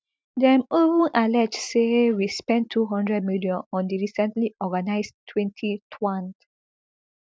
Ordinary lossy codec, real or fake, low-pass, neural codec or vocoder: none; real; none; none